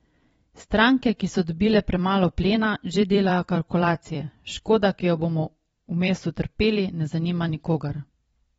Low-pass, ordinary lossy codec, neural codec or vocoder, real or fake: 19.8 kHz; AAC, 24 kbps; vocoder, 44.1 kHz, 128 mel bands every 256 samples, BigVGAN v2; fake